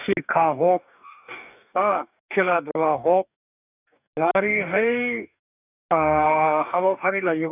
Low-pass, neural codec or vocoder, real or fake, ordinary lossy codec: 3.6 kHz; codec, 44.1 kHz, 2.6 kbps, DAC; fake; none